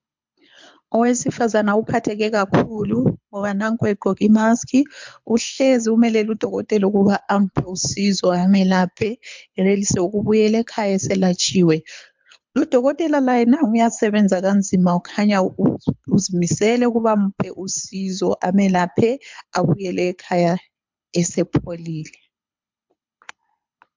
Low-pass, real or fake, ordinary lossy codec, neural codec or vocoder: 7.2 kHz; fake; MP3, 64 kbps; codec, 24 kHz, 6 kbps, HILCodec